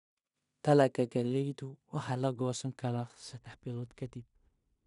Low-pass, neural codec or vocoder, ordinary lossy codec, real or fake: 10.8 kHz; codec, 16 kHz in and 24 kHz out, 0.4 kbps, LongCat-Audio-Codec, two codebook decoder; none; fake